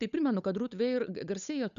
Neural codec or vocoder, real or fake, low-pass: codec, 16 kHz, 8 kbps, FunCodec, trained on Chinese and English, 25 frames a second; fake; 7.2 kHz